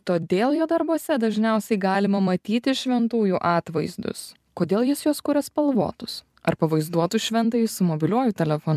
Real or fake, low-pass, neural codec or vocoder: fake; 14.4 kHz; vocoder, 44.1 kHz, 128 mel bands every 256 samples, BigVGAN v2